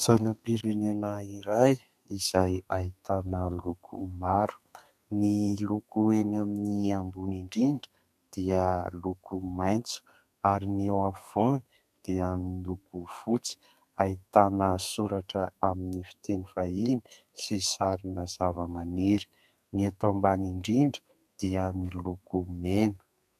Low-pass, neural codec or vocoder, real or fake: 14.4 kHz; codec, 32 kHz, 1.9 kbps, SNAC; fake